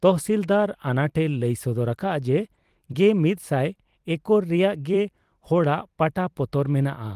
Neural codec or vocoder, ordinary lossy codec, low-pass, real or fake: vocoder, 44.1 kHz, 128 mel bands every 256 samples, BigVGAN v2; Opus, 24 kbps; 14.4 kHz; fake